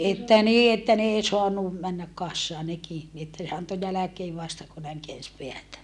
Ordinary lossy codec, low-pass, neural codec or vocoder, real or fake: none; none; none; real